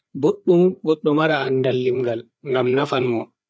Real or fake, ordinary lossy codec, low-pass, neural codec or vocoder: fake; none; none; codec, 16 kHz, 4 kbps, FreqCodec, larger model